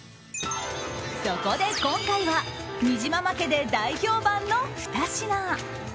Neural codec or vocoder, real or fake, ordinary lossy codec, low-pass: none; real; none; none